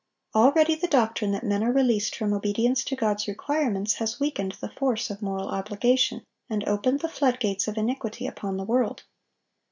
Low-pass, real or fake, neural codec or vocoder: 7.2 kHz; real; none